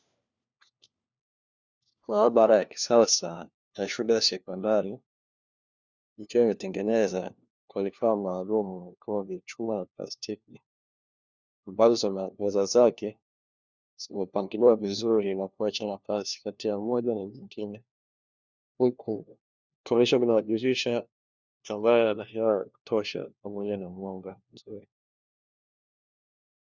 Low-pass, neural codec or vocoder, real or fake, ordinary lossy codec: 7.2 kHz; codec, 16 kHz, 1 kbps, FunCodec, trained on LibriTTS, 50 frames a second; fake; Opus, 64 kbps